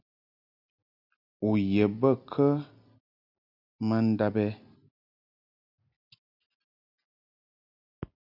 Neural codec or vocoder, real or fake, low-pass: none; real; 5.4 kHz